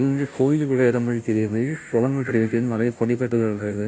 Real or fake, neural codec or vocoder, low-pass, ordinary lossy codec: fake; codec, 16 kHz, 0.5 kbps, FunCodec, trained on Chinese and English, 25 frames a second; none; none